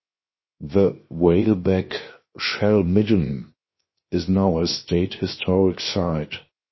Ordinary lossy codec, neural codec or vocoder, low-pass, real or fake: MP3, 24 kbps; codec, 16 kHz, 0.7 kbps, FocalCodec; 7.2 kHz; fake